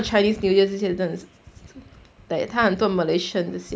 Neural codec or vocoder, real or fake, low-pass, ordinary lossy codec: none; real; none; none